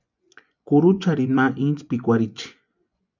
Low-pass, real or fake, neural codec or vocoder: 7.2 kHz; fake; vocoder, 24 kHz, 100 mel bands, Vocos